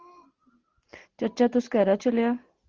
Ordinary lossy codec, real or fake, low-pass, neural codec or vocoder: Opus, 16 kbps; real; 7.2 kHz; none